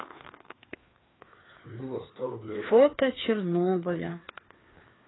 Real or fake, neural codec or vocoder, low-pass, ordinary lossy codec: fake; codec, 16 kHz, 2 kbps, FreqCodec, larger model; 7.2 kHz; AAC, 16 kbps